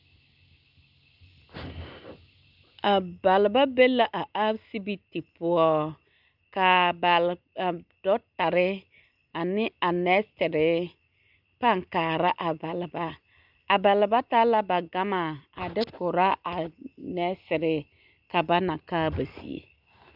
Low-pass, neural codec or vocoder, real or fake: 5.4 kHz; none; real